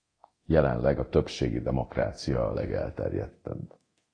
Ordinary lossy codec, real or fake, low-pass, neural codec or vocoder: AAC, 32 kbps; fake; 9.9 kHz; codec, 24 kHz, 0.9 kbps, DualCodec